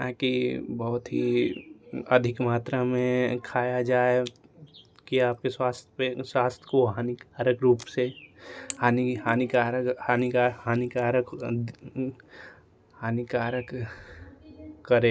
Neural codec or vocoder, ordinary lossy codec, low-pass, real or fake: none; none; none; real